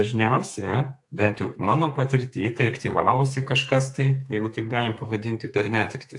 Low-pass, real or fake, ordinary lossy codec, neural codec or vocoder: 10.8 kHz; fake; AAC, 64 kbps; codec, 32 kHz, 1.9 kbps, SNAC